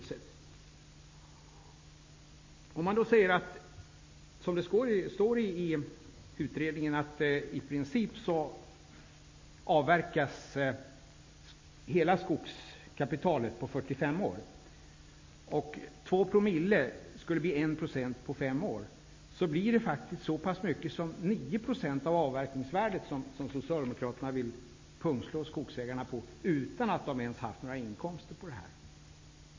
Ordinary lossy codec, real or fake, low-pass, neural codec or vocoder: MP3, 32 kbps; real; 7.2 kHz; none